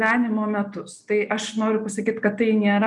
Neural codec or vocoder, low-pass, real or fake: none; 10.8 kHz; real